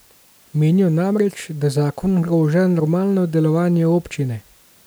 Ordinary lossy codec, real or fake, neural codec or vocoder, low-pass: none; real; none; none